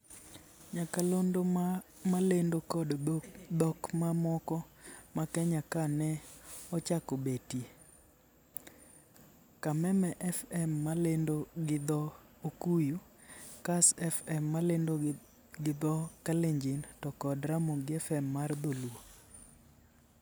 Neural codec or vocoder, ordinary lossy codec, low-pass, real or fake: none; none; none; real